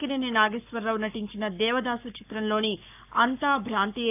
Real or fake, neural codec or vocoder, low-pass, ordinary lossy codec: fake; codec, 44.1 kHz, 7.8 kbps, Pupu-Codec; 3.6 kHz; AAC, 32 kbps